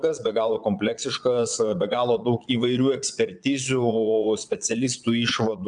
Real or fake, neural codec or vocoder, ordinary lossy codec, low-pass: fake; vocoder, 22.05 kHz, 80 mel bands, Vocos; MP3, 96 kbps; 9.9 kHz